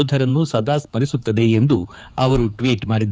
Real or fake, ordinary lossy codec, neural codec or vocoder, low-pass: fake; none; codec, 16 kHz, 4 kbps, X-Codec, HuBERT features, trained on general audio; none